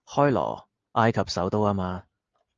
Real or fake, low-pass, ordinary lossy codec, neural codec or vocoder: real; 7.2 kHz; Opus, 24 kbps; none